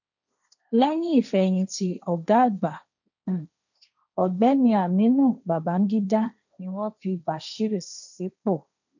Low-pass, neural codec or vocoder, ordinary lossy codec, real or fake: 7.2 kHz; codec, 16 kHz, 1.1 kbps, Voila-Tokenizer; none; fake